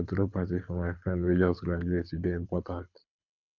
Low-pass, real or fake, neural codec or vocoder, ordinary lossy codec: 7.2 kHz; fake; codec, 24 kHz, 6 kbps, HILCodec; none